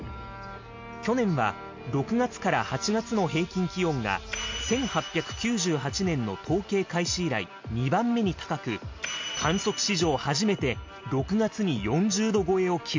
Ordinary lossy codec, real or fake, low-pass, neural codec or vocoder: MP3, 48 kbps; real; 7.2 kHz; none